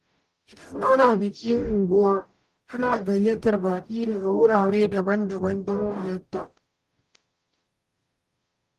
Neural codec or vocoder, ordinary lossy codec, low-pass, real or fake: codec, 44.1 kHz, 0.9 kbps, DAC; Opus, 32 kbps; 14.4 kHz; fake